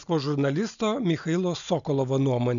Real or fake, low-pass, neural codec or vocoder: real; 7.2 kHz; none